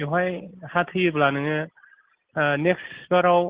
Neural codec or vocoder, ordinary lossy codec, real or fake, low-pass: none; Opus, 16 kbps; real; 3.6 kHz